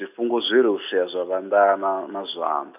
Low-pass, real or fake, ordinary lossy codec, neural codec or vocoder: 3.6 kHz; real; none; none